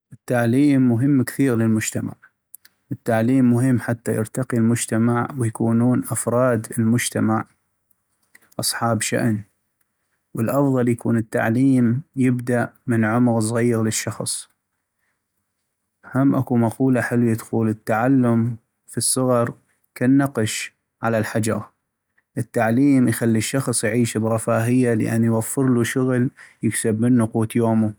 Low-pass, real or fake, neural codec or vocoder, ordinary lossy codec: none; real; none; none